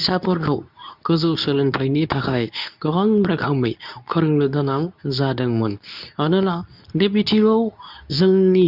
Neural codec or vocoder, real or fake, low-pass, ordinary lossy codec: codec, 24 kHz, 0.9 kbps, WavTokenizer, medium speech release version 2; fake; 5.4 kHz; none